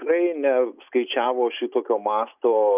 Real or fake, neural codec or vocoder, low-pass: real; none; 3.6 kHz